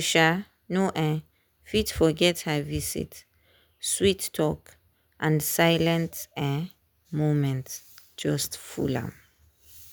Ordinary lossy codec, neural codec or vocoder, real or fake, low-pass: none; none; real; none